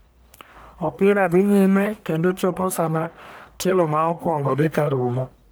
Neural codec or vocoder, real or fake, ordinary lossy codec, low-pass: codec, 44.1 kHz, 1.7 kbps, Pupu-Codec; fake; none; none